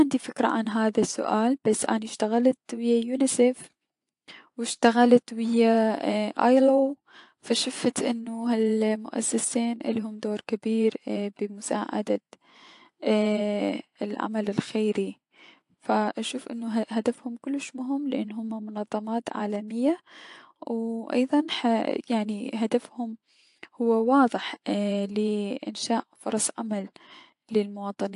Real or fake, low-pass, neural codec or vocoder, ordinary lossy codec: fake; 10.8 kHz; vocoder, 24 kHz, 100 mel bands, Vocos; AAC, 64 kbps